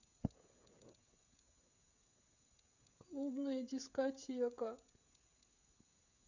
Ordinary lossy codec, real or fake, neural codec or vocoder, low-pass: none; fake; codec, 16 kHz, 16 kbps, FreqCodec, smaller model; 7.2 kHz